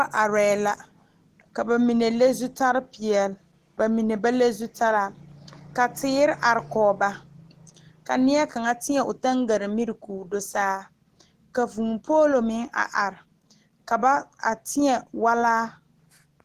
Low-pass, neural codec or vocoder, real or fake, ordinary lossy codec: 14.4 kHz; none; real; Opus, 16 kbps